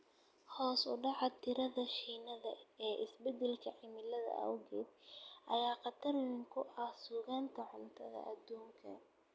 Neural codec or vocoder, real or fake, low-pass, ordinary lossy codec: none; real; none; none